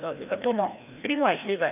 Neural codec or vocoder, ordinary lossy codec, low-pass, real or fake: codec, 16 kHz, 1 kbps, FreqCodec, larger model; none; 3.6 kHz; fake